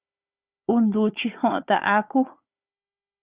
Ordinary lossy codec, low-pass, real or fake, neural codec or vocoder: Opus, 64 kbps; 3.6 kHz; fake; codec, 16 kHz, 4 kbps, FunCodec, trained on Chinese and English, 50 frames a second